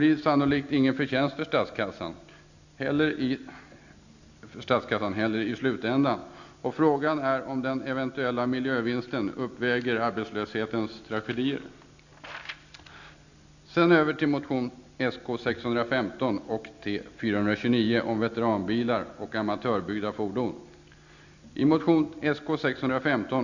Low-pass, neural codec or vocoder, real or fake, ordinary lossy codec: 7.2 kHz; none; real; none